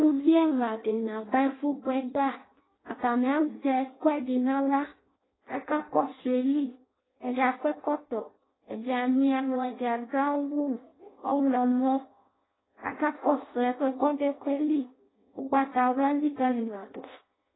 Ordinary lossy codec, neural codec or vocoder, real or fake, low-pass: AAC, 16 kbps; codec, 16 kHz in and 24 kHz out, 0.6 kbps, FireRedTTS-2 codec; fake; 7.2 kHz